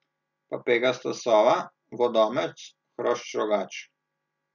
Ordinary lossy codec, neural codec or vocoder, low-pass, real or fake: none; none; 7.2 kHz; real